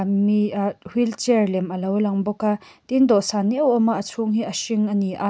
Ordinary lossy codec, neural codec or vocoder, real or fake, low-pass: none; none; real; none